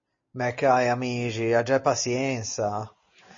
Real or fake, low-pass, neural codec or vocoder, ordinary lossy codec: real; 7.2 kHz; none; MP3, 32 kbps